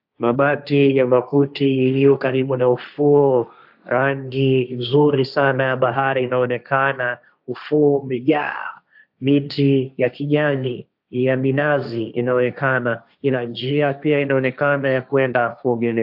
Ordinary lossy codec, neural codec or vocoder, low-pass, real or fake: AAC, 48 kbps; codec, 16 kHz, 1.1 kbps, Voila-Tokenizer; 5.4 kHz; fake